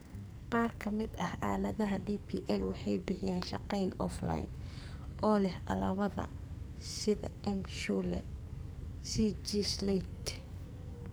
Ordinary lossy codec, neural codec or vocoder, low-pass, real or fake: none; codec, 44.1 kHz, 2.6 kbps, SNAC; none; fake